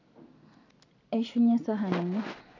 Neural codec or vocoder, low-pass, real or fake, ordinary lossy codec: none; 7.2 kHz; real; none